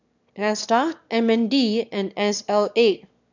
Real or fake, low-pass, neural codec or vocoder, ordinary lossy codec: fake; 7.2 kHz; autoencoder, 22.05 kHz, a latent of 192 numbers a frame, VITS, trained on one speaker; none